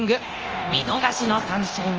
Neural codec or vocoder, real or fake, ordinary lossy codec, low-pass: codec, 24 kHz, 0.9 kbps, DualCodec; fake; Opus, 24 kbps; 7.2 kHz